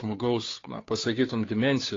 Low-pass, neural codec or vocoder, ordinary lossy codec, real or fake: 7.2 kHz; codec, 16 kHz, 2 kbps, FunCodec, trained on Chinese and English, 25 frames a second; AAC, 32 kbps; fake